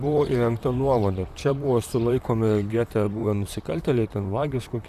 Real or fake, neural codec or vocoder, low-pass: fake; vocoder, 44.1 kHz, 128 mel bands, Pupu-Vocoder; 14.4 kHz